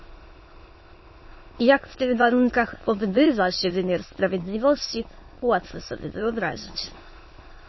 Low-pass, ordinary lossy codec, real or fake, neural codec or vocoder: 7.2 kHz; MP3, 24 kbps; fake; autoencoder, 22.05 kHz, a latent of 192 numbers a frame, VITS, trained on many speakers